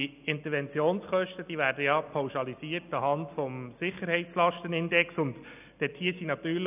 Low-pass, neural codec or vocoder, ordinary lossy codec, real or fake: 3.6 kHz; none; none; real